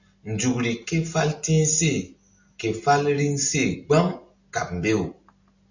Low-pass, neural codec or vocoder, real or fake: 7.2 kHz; none; real